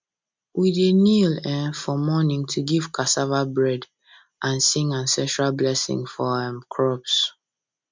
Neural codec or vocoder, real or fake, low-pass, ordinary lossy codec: none; real; 7.2 kHz; MP3, 64 kbps